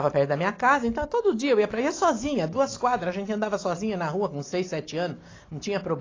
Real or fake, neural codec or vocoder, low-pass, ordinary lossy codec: real; none; 7.2 kHz; AAC, 32 kbps